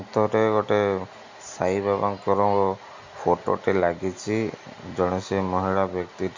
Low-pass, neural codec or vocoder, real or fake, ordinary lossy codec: 7.2 kHz; none; real; MP3, 48 kbps